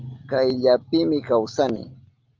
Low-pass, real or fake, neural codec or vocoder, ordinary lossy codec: 7.2 kHz; real; none; Opus, 32 kbps